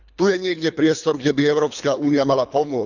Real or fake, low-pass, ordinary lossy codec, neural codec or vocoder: fake; 7.2 kHz; none; codec, 24 kHz, 3 kbps, HILCodec